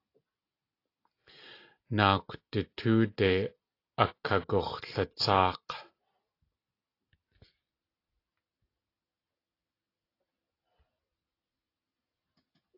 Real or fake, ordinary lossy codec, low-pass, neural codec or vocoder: real; AAC, 32 kbps; 5.4 kHz; none